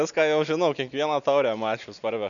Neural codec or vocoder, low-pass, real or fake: none; 7.2 kHz; real